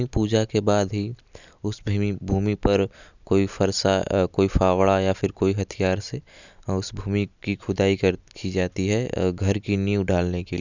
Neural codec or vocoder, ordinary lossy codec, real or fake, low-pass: none; none; real; 7.2 kHz